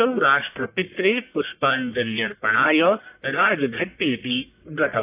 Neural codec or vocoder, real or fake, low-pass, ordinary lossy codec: codec, 44.1 kHz, 1.7 kbps, Pupu-Codec; fake; 3.6 kHz; AAC, 32 kbps